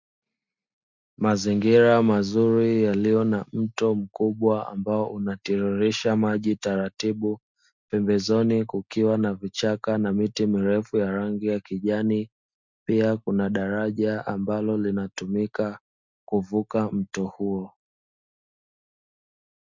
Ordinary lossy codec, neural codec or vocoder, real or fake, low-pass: MP3, 64 kbps; none; real; 7.2 kHz